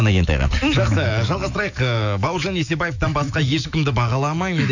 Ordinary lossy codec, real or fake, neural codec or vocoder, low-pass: none; fake; autoencoder, 48 kHz, 128 numbers a frame, DAC-VAE, trained on Japanese speech; 7.2 kHz